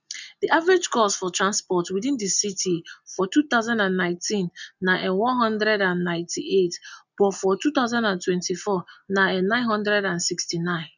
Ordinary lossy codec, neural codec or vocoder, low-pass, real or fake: none; none; 7.2 kHz; real